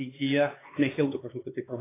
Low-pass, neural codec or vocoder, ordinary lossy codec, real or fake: 3.6 kHz; codec, 16 kHz, 4 kbps, X-Codec, HuBERT features, trained on LibriSpeech; AAC, 16 kbps; fake